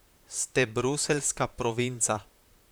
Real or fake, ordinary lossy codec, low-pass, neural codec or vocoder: fake; none; none; vocoder, 44.1 kHz, 128 mel bands, Pupu-Vocoder